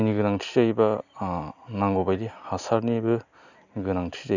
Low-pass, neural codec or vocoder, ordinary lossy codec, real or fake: 7.2 kHz; none; none; real